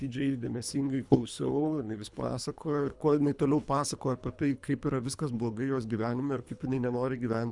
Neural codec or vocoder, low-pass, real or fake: codec, 24 kHz, 3 kbps, HILCodec; 10.8 kHz; fake